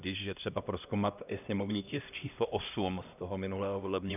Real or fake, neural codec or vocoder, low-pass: fake; codec, 16 kHz, 1 kbps, X-Codec, HuBERT features, trained on LibriSpeech; 3.6 kHz